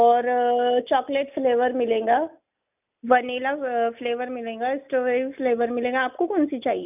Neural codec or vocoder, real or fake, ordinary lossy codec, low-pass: none; real; none; 3.6 kHz